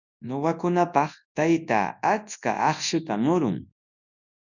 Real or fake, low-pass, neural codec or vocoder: fake; 7.2 kHz; codec, 24 kHz, 0.9 kbps, WavTokenizer, large speech release